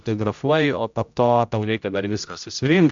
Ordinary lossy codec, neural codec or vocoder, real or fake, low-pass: MP3, 64 kbps; codec, 16 kHz, 0.5 kbps, X-Codec, HuBERT features, trained on general audio; fake; 7.2 kHz